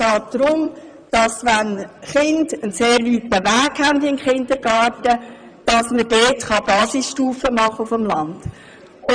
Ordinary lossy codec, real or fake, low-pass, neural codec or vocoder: none; fake; 9.9 kHz; vocoder, 44.1 kHz, 128 mel bands, Pupu-Vocoder